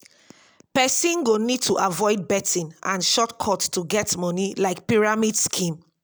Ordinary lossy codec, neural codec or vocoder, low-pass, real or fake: none; none; none; real